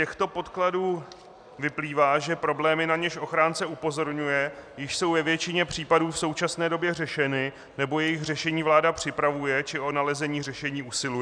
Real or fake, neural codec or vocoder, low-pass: real; none; 9.9 kHz